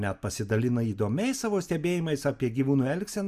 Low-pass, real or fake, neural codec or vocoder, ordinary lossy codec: 14.4 kHz; real; none; Opus, 64 kbps